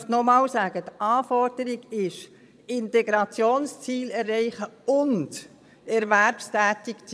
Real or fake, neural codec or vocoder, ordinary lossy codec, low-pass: fake; vocoder, 22.05 kHz, 80 mel bands, Vocos; none; none